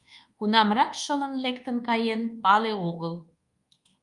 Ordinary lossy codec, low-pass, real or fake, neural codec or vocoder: Opus, 32 kbps; 10.8 kHz; fake; codec, 24 kHz, 1.2 kbps, DualCodec